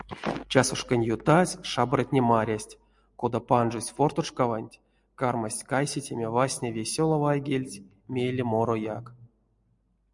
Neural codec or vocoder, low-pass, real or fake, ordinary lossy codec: none; 10.8 kHz; real; MP3, 96 kbps